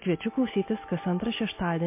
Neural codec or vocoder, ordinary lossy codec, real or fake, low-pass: none; MP3, 24 kbps; real; 3.6 kHz